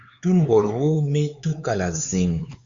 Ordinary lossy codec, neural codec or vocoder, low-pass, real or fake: Opus, 64 kbps; codec, 16 kHz, 4 kbps, X-Codec, HuBERT features, trained on LibriSpeech; 7.2 kHz; fake